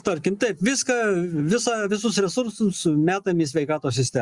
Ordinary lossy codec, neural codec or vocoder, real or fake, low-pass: Opus, 64 kbps; none; real; 10.8 kHz